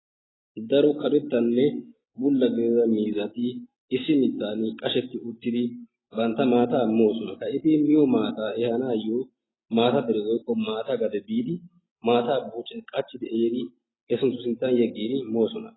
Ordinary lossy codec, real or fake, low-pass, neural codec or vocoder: AAC, 16 kbps; real; 7.2 kHz; none